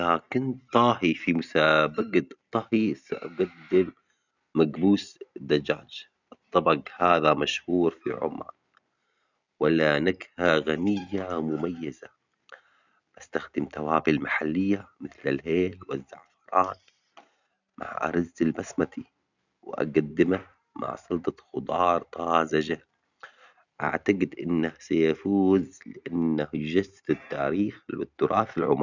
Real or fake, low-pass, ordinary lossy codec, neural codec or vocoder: real; 7.2 kHz; none; none